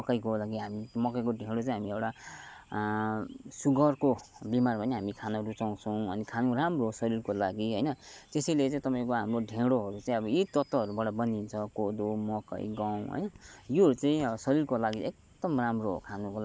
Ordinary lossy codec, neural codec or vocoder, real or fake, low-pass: none; none; real; none